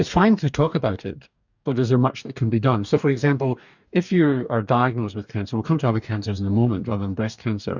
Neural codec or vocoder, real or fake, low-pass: codec, 44.1 kHz, 2.6 kbps, DAC; fake; 7.2 kHz